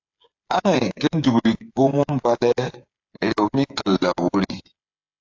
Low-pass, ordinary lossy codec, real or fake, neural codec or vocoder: 7.2 kHz; AAC, 48 kbps; fake; codec, 16 kHz, 8 kbps, FreqCodec, smaller model